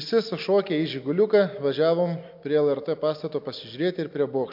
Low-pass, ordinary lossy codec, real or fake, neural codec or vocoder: 5.4 kHz; MP3, 48 kbps; real; none